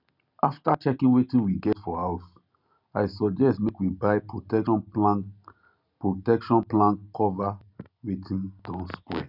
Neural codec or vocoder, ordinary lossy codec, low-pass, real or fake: none; none; 5.4 kHz; real